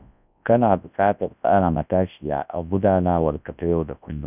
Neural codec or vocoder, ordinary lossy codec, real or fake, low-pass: codec, 24 kHz, 0.9 kbps, WavTokenizer, large speech release; none; fake; 3.6 kHz